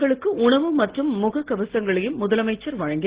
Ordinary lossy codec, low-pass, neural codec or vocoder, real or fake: Opus, 16 kbps; 3.6 kHz; none; real